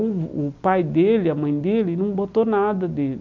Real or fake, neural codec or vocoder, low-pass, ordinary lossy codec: real; none; 7.2 kHz; none